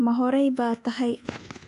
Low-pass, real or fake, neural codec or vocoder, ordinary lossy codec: 10.8 kHz; fake; codec, 24 kHz, 1.2 kbps, DualCodec; AAC, 64 kbps